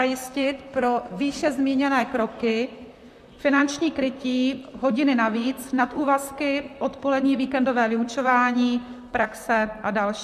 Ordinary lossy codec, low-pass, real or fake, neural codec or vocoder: MP3, 96 kbps; 14.4 kHz; fake; vocoder, 44.1 kHz, 128 mel bands, Pupu-Vocoder